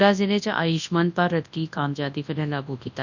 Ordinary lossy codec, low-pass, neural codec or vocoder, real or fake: none; 7.2 kHz; codec, 24 kHz, 0.9 kbps, WavTokenizer, large speech release; fake